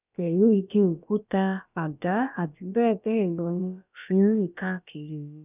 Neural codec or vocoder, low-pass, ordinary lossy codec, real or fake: codec, 16 kHz, about 1 kbps, DyCAST, with the encoder's durations; 3.6 kHz; none; fake